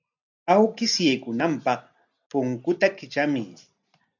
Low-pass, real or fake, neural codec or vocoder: 7.2 kHz; real; none